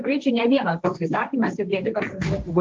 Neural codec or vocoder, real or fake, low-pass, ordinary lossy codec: codec, 16 kHz, 1.1 kbps, Voila-Tokenizer; fake; 7.2 kHz; Opus, 32 kbps